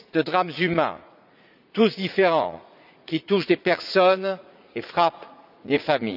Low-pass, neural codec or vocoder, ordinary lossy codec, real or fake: 5.4 kHz; vocoder, 44.1 kHz, 80 mel bands, Vocos; none; fake